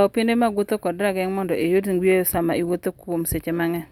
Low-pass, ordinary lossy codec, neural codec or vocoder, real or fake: 19.8 kHz; none; none; real